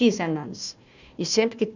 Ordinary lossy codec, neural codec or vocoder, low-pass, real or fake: none; codec, 16 kHz, 1 kbps, FunCodec, trained on Chinese and English, 50 frames a second; 7.2 kHz; fake